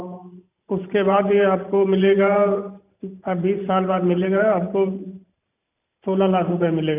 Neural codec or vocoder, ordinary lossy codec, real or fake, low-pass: vocoder, 44.1 kHz, 128 mel bands every 512 samples, BigVGAN v2; none; fake; 3.6 kHz